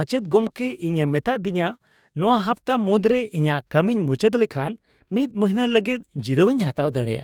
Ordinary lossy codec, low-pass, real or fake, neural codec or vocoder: none; 19.8 kHz; fake; codec, 44.1 kHz, 2.6 kbps, DAC